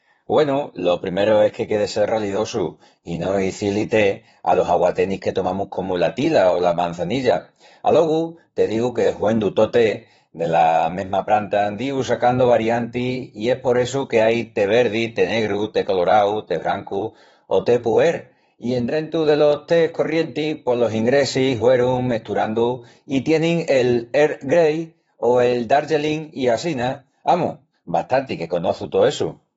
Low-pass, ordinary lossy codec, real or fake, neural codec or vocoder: 19.8 kHz; AAC, 24 kbps; fake; vocoder, 44.1 kHz, 128 mel bands, Pupu-Vocoder